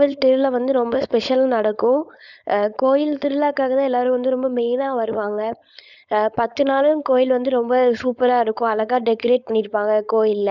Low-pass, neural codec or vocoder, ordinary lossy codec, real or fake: 7.2 kHz; codec, 16 kHz, 4.8 kbps, FACodec; none; fake